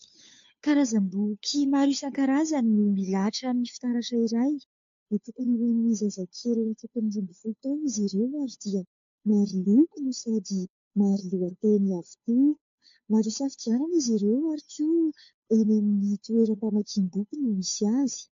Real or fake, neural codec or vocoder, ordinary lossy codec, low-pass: fake; codec, 16 kHz, 2 kbps, FunCodec, trained on Chinese and English, 25 frames a second; AAC, 48 kbps; 7.2 kHz